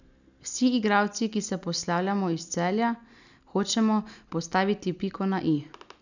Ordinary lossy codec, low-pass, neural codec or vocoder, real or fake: none; 7.2 kHz; none; real